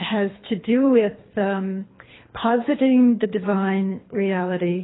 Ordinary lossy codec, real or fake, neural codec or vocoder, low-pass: AAC, 16 kbps; fake; codec, 24 kHz, 3 kbps, HILCodec; 7.2 kHz